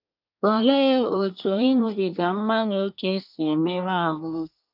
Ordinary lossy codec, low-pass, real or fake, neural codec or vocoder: none; 5.4 kHz; fake; codec, 24 kHz, 1 kbps, SNAC